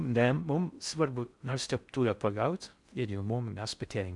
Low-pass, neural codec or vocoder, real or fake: 10.8 kHz; codec, 16 kHz in and 24 kHz out, 0.6 kbps, FocalCodec, streaming, 4096 codes; fake